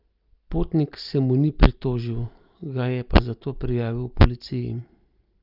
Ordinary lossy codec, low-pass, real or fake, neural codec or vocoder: Opus, 32 kbps; 5.4 kHz; real; none